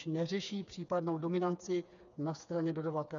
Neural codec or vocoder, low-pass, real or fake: codec, 16 kHz, 4 kbps, FreqCodec, smaller model; 7.2 kHz; fake